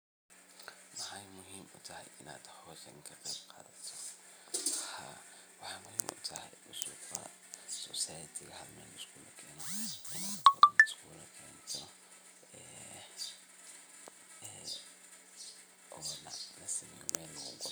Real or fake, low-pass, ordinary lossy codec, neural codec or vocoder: real; none; none; none